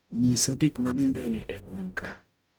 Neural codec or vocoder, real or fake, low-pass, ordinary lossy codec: codec, 44.1 kHz, 0.9 kbps, DAC; fake; none; none